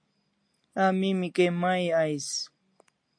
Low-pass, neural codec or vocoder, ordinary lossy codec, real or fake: 9.9 kHz; none; MP3, 48 kbps; real